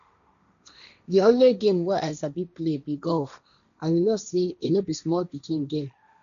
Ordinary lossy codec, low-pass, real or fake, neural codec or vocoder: none; 7.2 kHz; fake; codec, 16 kHz, 1.1 kbps, Voila-Tokenizer